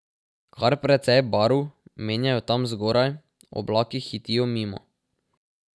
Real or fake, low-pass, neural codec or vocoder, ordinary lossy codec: real; none; none; none